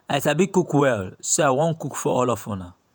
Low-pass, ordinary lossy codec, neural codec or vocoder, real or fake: none; none; vocoder, 48 kHz, 128 mel bands, Vocos; fake